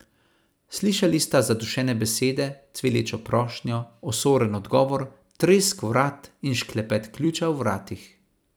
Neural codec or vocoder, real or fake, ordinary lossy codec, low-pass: vocoder, 44.1 kHz, 128 mel bands every 256 samples, BigVGAN v2; fake; none; none